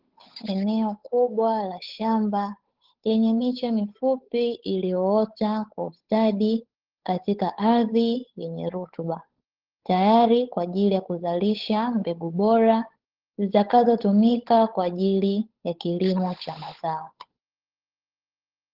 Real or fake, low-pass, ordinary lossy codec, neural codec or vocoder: fake; 5.4 kHz; Opus, 16 kbps; codec, 16 kHz, 8 kbps, FunCodec, trained on Chinese and English, 25 frames a second